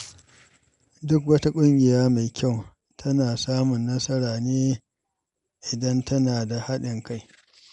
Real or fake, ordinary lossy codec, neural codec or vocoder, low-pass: real; none; none; 10.8 kHz